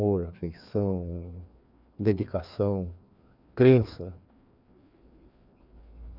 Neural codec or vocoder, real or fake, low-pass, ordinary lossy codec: codec, 16 kHz, 2 kbps, FreqCodec, larger model; fake; 5.4 kHz; none